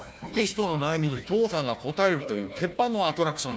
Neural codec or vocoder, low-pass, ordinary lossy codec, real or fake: codec, 16 kHz, 1 kbps, FunCodec, trained on Chinese and English, 50 frames a second; none; none; fake